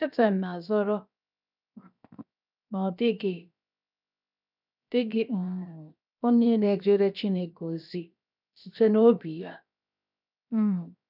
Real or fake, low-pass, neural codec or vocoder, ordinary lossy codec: fake; 5.4 kHz; codec, 16 kHz, 0.7 kbps, FocalCodec; none